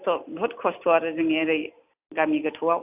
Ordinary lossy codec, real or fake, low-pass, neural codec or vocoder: AAC, 32 kbps; real; 3.6 kHz; none